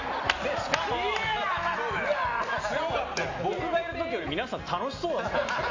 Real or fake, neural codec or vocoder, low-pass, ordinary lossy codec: real; none; 7.2 kHz; AAC, 48 kbps